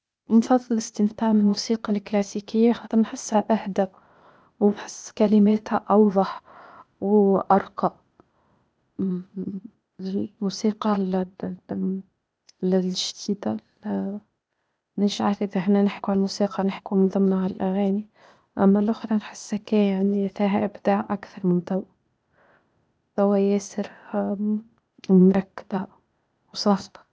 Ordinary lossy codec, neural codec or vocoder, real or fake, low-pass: none; codec, 16 kHz, 0.8 kbps, ZipCodec; fake; none